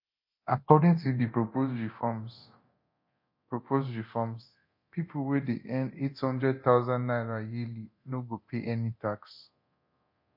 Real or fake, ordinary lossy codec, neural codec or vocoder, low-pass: fake; MP3, 32 kbps; codec, 24 kHz, 0.9 kbps, DualCodec; 5.4 kHz